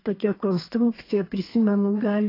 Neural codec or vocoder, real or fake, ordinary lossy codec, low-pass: codec, 32 kHz, 1.9 kbps, SNAC; fake; AAC, 24 kbps; 5.4 kHz